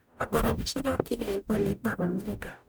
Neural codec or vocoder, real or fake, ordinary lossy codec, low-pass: codec, 44.1 kHz, 0.9 kbps, DAC; fake; none; none